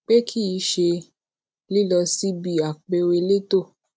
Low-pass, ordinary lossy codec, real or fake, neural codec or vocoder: none; none; real; none